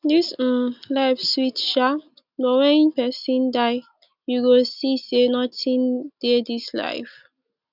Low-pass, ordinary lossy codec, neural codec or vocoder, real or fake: 5.4 kHz; none; none; real